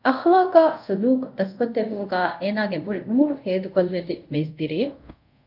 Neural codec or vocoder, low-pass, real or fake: codec, 24 kHz, 0.5 kbps, DualCodec; 5.4 kHz; fake